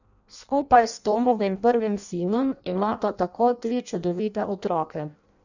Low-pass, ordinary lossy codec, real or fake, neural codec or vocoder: 7.2 kHz; none; fake; codec, 16 kHz in and 24 kHz out, 0.6 kbps, FireRedTTS-2 codec